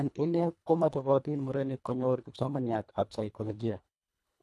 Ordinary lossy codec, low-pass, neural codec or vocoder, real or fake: none; none; codec, 24 kHz, 1.5 kbps, HILCodec; fake